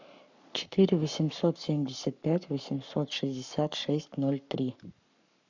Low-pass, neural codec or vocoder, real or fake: 7.2 kHz; codec, 44.1 kHz, 7.8 kbps, Pupu-Codec; fake